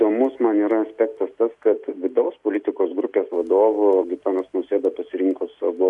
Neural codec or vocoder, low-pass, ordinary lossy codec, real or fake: none; 10.8 kHz; MP3, 64 kbps; real